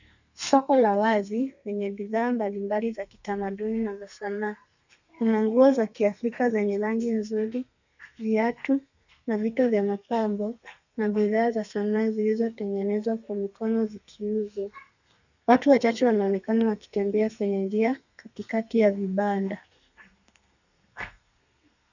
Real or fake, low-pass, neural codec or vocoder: fake; 7.2 kHz; codec, 32 kHz, 1.9 kbps, SNAC